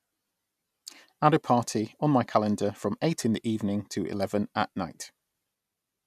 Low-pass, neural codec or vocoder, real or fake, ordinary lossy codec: 14.4 kHz; none; real; none